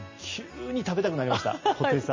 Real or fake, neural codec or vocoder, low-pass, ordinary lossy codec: real; none; 7.2 kHz; MP3, 32 kbps